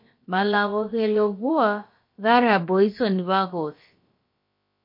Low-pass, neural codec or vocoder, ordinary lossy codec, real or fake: 5.4 kHz; codec, 16 kHz, about 1 kbps, DyCAST, with the encoder's durations; MP3, 32 kbps; fake